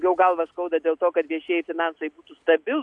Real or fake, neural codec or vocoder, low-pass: real; none; 10.8 kHz